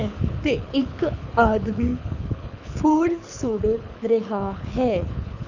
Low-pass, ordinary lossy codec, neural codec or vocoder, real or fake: 7.2 kHz; none; codec, 24 kHz, 6 kbps, HILCodec; fake